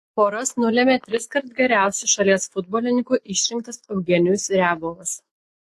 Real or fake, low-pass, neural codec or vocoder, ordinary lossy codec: fake; 14.4 kHz; autoencoder, 48 kHz, 128 numbers a frame, DAC-VAE, trained on Japanese speech; AAC, 48 kbps